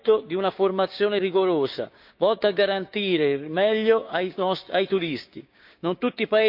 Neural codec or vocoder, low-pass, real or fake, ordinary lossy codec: codec, 16 kHz, 6 kbps, DAC; 5.4 kHz; fake; none